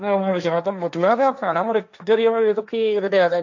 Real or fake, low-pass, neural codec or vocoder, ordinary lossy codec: fake; 7.2 kHz; codec, 16 kHz, 1.1 kbps, Voila-Tokenizer; none